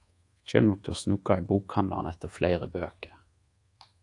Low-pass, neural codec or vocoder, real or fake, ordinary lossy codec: 10.8 kHz; codec, 24 kHz, 1.2 kbps, DualCodec; fake; AAC, 48 kbps